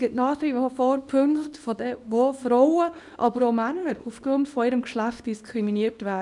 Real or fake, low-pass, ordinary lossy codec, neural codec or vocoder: fake; 10.8 kHz; none; codec, 24 kHz, 0.9 kbps, WavTokenizer, small release